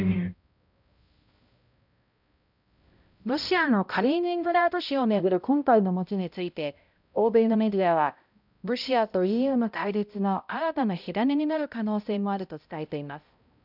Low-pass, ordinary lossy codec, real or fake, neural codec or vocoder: 5.4 kHz; none; fake; codec, 16 kHz, 0.5 kbps, X-Codec, HuBERT features, trained on balanced general audio